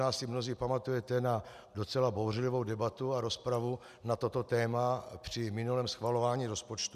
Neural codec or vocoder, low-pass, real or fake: none; 14.4 kHz; real